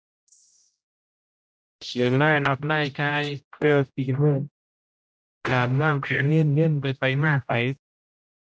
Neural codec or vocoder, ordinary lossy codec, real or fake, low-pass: codec, 16 kHz, 0.5 kbps, X-Codec, HuBERT features, trained on general audio; none; fake; none